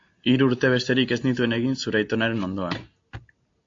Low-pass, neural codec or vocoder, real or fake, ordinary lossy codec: 7.2 kHz; none; real; AAC, 48 kbps